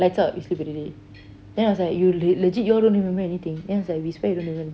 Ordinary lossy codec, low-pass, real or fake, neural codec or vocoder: none; none; real; none